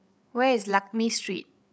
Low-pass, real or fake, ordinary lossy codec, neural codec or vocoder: none; fake; none; codec, 16 kHz, 8 kbps, FreqCodec, larger model